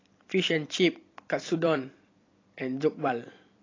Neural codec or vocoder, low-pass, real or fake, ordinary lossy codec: vocoder, 44.1 kHz, 128 mel bands every 256 samples, BigVGAN v2; 7.2 kHz; fake; AAC, 32 kbps